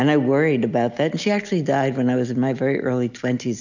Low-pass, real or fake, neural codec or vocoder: 7.2 kHz; real; none